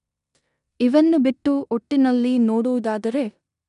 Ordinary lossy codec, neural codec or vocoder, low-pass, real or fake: none; codec, 16 kHz in and 24 kHz out, 0.9 kbps, LongCat-Audio-Codec, four codebook decoder; 10.8 kHz; fake